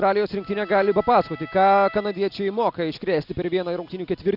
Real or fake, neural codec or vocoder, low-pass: real; none; 5.4 kHz